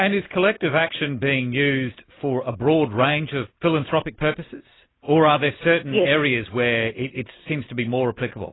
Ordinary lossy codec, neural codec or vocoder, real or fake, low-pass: AAC, 16 kbps; none; real; 7.2 kHz